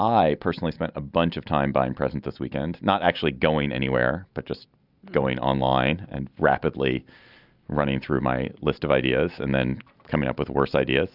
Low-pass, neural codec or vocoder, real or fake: 5.4 kHz; none; real